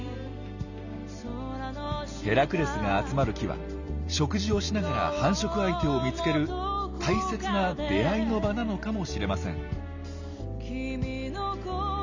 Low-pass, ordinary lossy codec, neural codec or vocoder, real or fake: 7.2 kHz; none; none; real